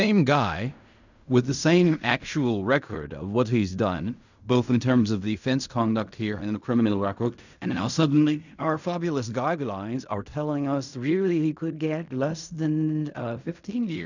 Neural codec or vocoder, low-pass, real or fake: codec, 16 kHz in and 24 kHz out, 0.4 kbps, LongCat-Audio-Codec, fine tuned four codebook decoder; 7.2 kHz; fake